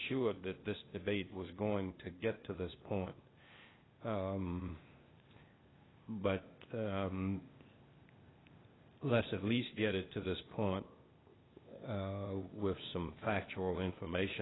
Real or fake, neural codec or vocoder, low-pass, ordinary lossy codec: fake; codec, 16 kHz, 0.8 kbps, ZipCodec; 7.2 kHz; AAC, 16 kbps